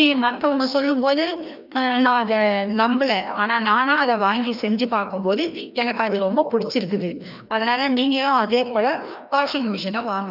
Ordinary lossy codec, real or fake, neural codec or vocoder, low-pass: none; fake; codec, 16 kHz, 1 kbps, FreqCodec, larger model; 5.4 kHz